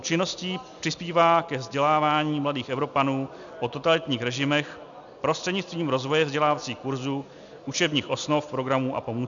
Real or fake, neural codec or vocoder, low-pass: real; none; 7.2 kHz